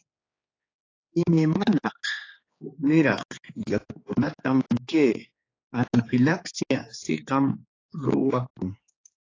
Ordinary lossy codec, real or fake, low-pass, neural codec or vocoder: AAC, 32 kbps; fake; 7.2 kHz; codec, 16 kHz, 4 kbps, X-Codec, HuBERT features, trained on general audio